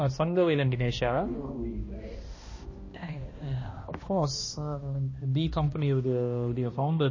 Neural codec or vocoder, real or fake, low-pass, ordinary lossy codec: codec, 16 kHz, 1 kbps, X-Codec, HuBERT features, trained on balanced general audio; fake; 7.2 kHz; MP3, 32 kbps